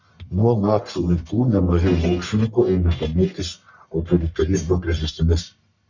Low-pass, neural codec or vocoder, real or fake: 7.2 kHz; codec, 44.1 kHz, 1.7 kbps, Pupu-Codec; fake